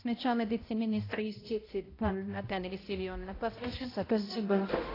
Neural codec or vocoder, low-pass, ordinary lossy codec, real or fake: codec, 16 kHz, 0.5 kbps, X-Codec, HuBERT features, trained on balanced general audio; 5.4 kHz; AAC, 24 kbps; fake